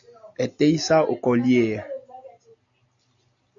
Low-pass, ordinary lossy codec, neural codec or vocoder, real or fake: 7.2 kHz; AAC, 64 kbps; none; real